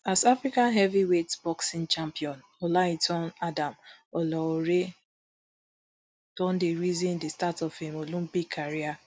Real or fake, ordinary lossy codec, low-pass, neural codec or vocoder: real; none; none; none